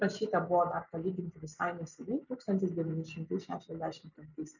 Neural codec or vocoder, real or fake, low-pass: none; real; 7.2 kHz